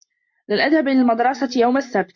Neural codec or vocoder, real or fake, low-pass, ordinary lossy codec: none; real; 7.2 kHz; MP3, 64 kbps